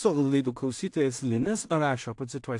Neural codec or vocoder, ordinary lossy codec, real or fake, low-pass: codec, 16 kHz in and 24 kHz out, 0.4 kbps, LongCat-Audio-Codec, two codebook decoder; AAC, 64 kbps; fake; 10.8 kHz